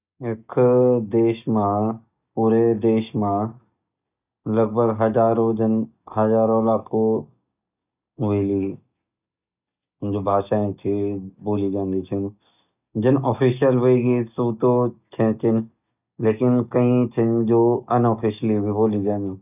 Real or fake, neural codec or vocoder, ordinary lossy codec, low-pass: real; none; none; 3.6 kHz